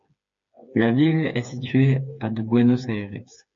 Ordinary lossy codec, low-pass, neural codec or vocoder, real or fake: MP3, 48 kbps; 7.2 kHz; codec, 16 kHz, 4 kbps, FreqCodec, smaller model; fake